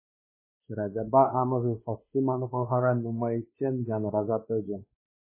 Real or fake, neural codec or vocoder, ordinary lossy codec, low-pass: fake; codec, 16 kHz, 2 kbps, X-Codec, WavLM features, trained on Multilingual LibriSpeech; MP3, 16 kbps; 3.6 kHz